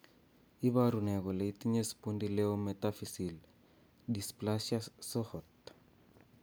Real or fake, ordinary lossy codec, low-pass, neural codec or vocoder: real; none; none; none